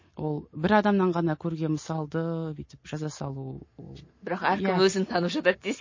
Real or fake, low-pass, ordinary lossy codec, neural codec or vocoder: real; 7.2 kHz; MP3, 32 kbps; none